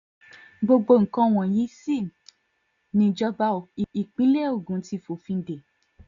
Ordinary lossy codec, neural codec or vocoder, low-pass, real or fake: none; none; 7.2 kHz; real